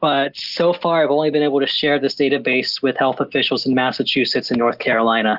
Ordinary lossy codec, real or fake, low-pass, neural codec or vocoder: Opus, 24 kbps; real; 5.4 kHz; none